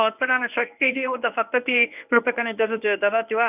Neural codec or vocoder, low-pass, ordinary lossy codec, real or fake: codec, 24 kHz, 0.9 kbps, WavTokenizer, medium speech release version 1; 3.6 kHz; none; fake